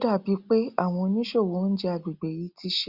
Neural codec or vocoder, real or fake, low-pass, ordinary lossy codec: none; real; 5.4 kHz; Opus, 64 kbps